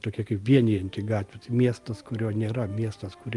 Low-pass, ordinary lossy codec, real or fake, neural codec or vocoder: 10.8 kHz; Opus, 32 kbps; real; none